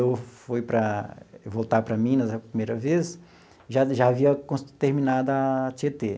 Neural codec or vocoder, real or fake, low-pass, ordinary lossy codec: none; real; none; none